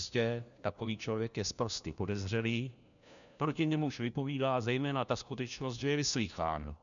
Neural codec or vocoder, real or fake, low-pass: codec, 16 kHz, 1 kbps, FunCodec, trained on LibriTTS, 50 frames a second; fake; 7.2 kHz